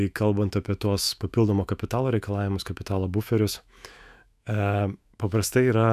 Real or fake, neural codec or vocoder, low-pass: fake; autoencoder, 48 kHz, 128 numbers a frame, DAC-VAE, trained on Japanese speech; 14.4 kHz